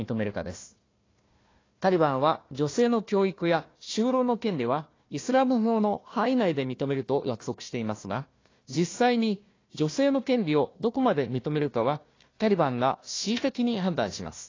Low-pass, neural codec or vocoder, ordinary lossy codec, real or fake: 7.2 kHz; codec, 16 kHz, 1 kbps, FunCodec, trained on Chinese and English, 50 frames a second; AAC, 32 kbps; fake